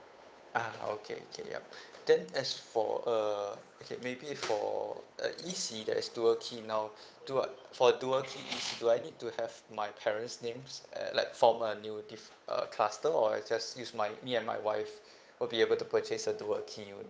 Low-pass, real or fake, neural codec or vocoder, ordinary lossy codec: none; fake; codec, 16 kHz, 8 kbps, FunCodec, trained on Chinese and English, 25 frames a second; none